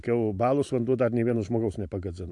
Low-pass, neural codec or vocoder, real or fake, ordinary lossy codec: 10.8 kHz; vocoder, 44.1 kHz, 128 mel bands every 512 samples, BigVGAN v2; fake; AAC, 64 kbps